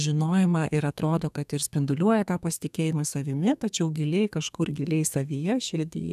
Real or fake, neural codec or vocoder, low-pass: fake; codec, 32 kHz, 1.9 kbps, SNAC; 14.4 kHz